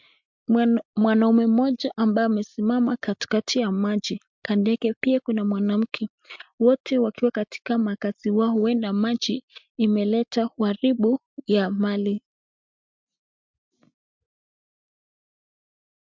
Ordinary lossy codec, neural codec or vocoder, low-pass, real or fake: MP3, 64 kbps; none; 7.2 kHz; real